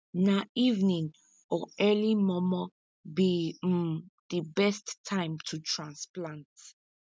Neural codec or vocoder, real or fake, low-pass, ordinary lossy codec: none; real; none; none